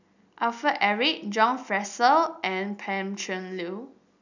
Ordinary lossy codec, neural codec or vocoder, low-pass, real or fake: none; none; 7.2 kHz; real